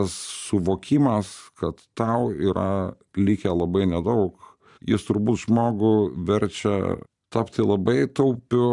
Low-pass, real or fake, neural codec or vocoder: 10.8 kHz; fake; vocoder, 44.1 kHz, 128 mel bands every 512 samples, BigVGAN v2